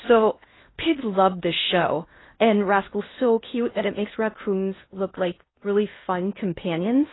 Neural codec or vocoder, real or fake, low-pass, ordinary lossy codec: codec, 16 kHz in and 24 kHz out, 0.6 kbps, FocalCodec, streaming, 2048 codes; fake; 7.2 kHz; AAC, 16 kbps